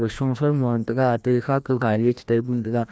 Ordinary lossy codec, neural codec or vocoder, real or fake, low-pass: none; codec, 16 kHz, 1 kbps, FreqCodec, larger model; fake; none